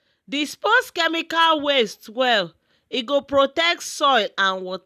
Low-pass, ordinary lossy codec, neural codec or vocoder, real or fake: 14.4 kHz; none; none; real